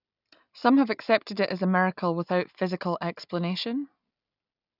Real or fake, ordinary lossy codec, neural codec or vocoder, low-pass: real; none; none; 5.4 kHz